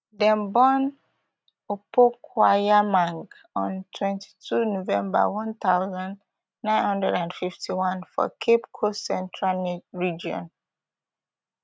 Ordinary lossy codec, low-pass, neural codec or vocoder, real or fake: none; none; none; real